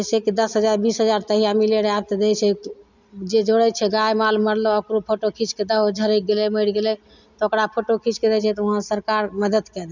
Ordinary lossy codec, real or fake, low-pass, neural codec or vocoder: none; real; 7.2 kHz; none